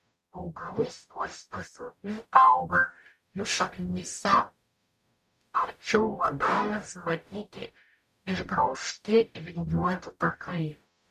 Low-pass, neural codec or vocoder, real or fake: 14.4 kHz; codec, 44.1 kHz, 0.9 kbps, DAC; fake